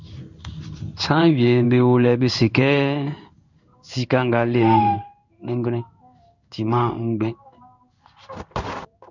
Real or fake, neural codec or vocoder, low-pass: fake; codec, 16 kHz in and 24 kHz out, 1 kbps, XY-Tokenizer; 7.2 kHz